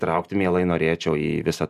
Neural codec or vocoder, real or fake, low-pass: none; real; 14.4 kHz